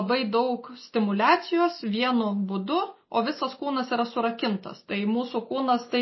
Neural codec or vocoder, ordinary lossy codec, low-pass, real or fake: none; MP3, 24 kbps; 7.2 kHz; real